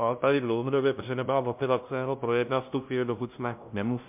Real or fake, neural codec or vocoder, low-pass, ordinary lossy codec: fake; codec, 16 kHz, 0.5 kbps, FunCodec, trained on LibriTTS, 25 frames a second; 3.6 kHz; MP3, 32 kbps